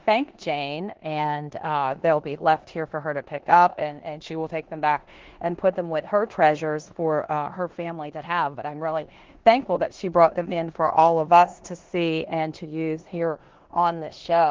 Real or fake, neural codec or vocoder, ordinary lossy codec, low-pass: fake; codec, 16 kHz in and 24 kHz out, 0.9 kbps, LongCat-Audio-Codec, four codebook decoder; Opus, 16 kbps; 7.2 kHz